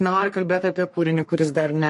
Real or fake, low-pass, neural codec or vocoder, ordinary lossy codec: fake; 14.4 kHz; codec, 44.1 kHz, 3.4 kbps, Pupu-Codec; MP3, 48 kbps